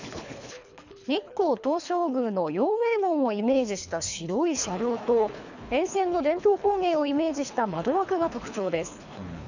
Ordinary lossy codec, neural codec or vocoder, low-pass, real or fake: none; codec, 24 kHz, 3 kbps, HILCodec; 7.2 kHz; fake